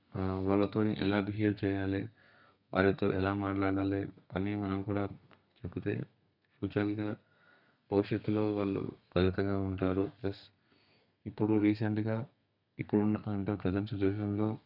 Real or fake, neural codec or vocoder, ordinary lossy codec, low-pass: fake; codec, 32 kHz, 1.9 kbps, SNAC; none; 5.4 kHz